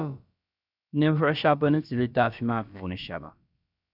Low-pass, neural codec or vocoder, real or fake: 5.4 kHz; codec, 16 kHz, about 1 kbps, DyCAST, with the encoder's durations; fake